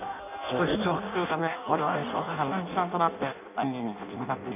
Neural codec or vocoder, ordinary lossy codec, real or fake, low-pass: codec, 16 kHz in and 24 kHz out, 0.6 kbps, FireRedTTS-2 codec; none; fake; 3.6 kHz